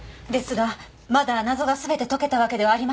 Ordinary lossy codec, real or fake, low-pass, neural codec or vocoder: none; real; none; none